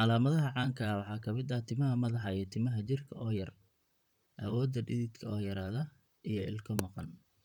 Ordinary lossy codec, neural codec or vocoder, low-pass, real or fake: none; vocoder, 44.1 kHz, 128 mel bands, Pupu-Vocoder; 19.8 kHz; fake